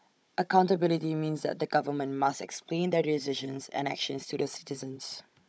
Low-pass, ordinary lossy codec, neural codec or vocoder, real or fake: none; none; codec, 16 kHz, 16 kbps, FunCodec, trained on Chinese and English, 50 frames a second; fake